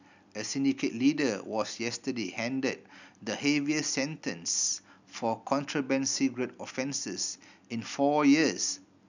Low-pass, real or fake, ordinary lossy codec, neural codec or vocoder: 7.2 kHz; real; none; none